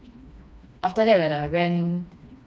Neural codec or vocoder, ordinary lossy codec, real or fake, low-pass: codec, 16 kHz, 2 kbps, FreqCodec, smaller model; none; fake; none